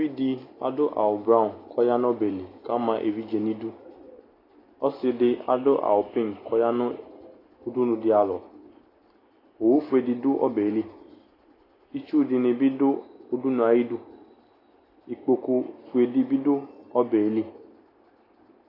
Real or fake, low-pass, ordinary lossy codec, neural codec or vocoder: real; 5.4 kHz; AAC, 24 kbps; none